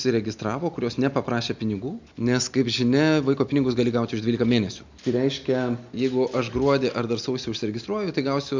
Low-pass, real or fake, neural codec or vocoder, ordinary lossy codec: 7.2 kHz; real; none; MP3, 64 kbps